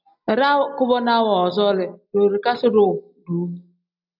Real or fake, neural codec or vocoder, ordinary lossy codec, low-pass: real; none; AAC, 48 kbps; 5.4 kHz